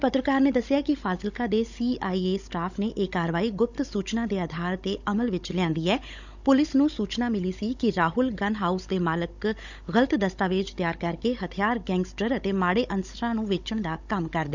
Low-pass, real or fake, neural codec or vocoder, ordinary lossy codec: 7.2 kHz; fake; codec, 16 kHz, 16 kbps, FunCodec, trained on Chinese and English, 50 frames a second; none